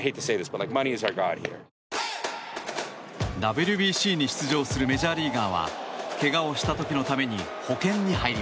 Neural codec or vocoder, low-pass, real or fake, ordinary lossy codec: none; none; real; none